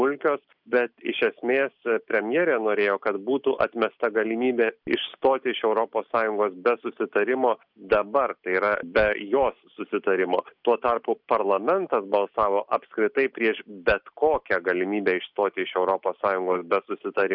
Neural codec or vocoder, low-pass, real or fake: none; 5.4 kHz; real